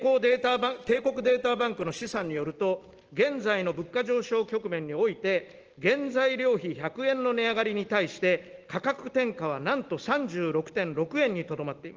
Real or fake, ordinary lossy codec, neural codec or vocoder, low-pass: real; Opus, 16 kbps; none; 7.2 kHz